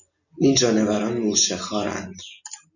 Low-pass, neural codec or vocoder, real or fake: 7.2 kHz; none; real